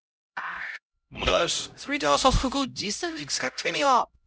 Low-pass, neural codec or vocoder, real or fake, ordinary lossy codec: none; codec, 16 kHz, 0.5 kbps, X-Codec, HuBERT features, trained on LibriSpeech; fake; none